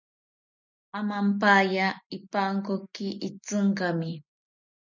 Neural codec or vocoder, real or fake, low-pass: none; real; 7.2 kHz